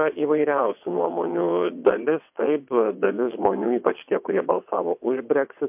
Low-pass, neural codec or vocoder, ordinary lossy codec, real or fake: 3.6 kHz; vocoder, 22.05 kHz, 80 mel bands, WaveNeXt; MP3, 32 kbps; fake